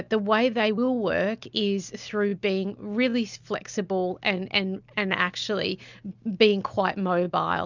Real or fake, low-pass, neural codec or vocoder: real; 7.2 kHz; none